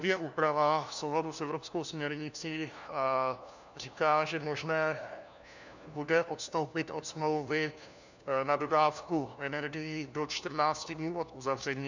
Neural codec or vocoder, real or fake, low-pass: codec, 16 kHz, 1 kbps, FunCodec, trained on LibriTTS, 50 frames a second; fake; 7.2 kHz